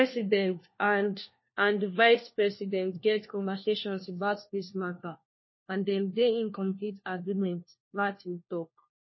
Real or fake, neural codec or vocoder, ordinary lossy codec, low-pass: fake; codec, 16 kHz, 1 kbps, FunCodec, trained on LibriTTS, 50 frames a second; MP3, 24 kbps; 7.2 kHz